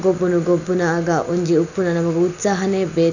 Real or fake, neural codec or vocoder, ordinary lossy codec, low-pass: real; none; none; 7.2 kHz